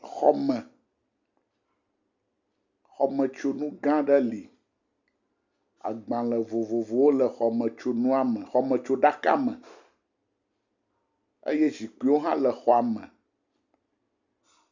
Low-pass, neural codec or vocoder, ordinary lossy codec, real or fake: 7.2 kHz; none; Opus, 64 kbps; real